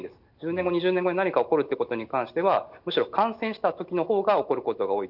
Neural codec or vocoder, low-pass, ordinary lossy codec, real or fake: none; 5.4 kHz; none; real